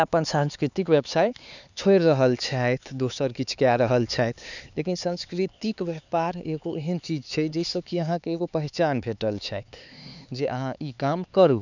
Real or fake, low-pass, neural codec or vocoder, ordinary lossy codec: fake; 7.2 kHz; codec, 16 kHz, 4 kbps, X-Codec, HuBERT features, trained on LibriSpeech; none